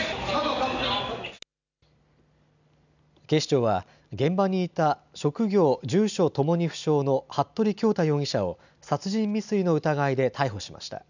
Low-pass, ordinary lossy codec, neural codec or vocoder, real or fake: 7.2 kHz; none; none; real